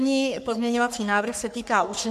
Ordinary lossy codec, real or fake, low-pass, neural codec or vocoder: MP3, 96 kbps; fake; 14.4 kHz; codec, 44.1 kHz, 3.4 kbps, Pupu-Codec